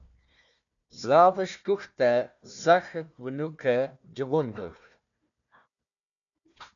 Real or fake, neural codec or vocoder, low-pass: fake; codec, 16 kHz, 1 kbps, FunCodec, trained on Chinese and English, 50 frames a second; 7.2 kHz